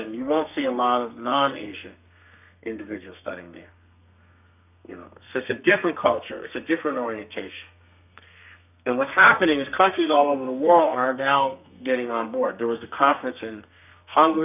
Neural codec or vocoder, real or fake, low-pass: codec, 32 kHz, 1.9 kbps, SNAC; fake; 3.6 kHz